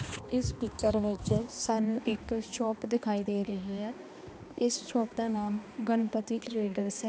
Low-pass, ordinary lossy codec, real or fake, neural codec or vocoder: none; none; fake; codec, 16 kHz, 2 kbps, X-Codec, HuBERT features, trained on balanced general audio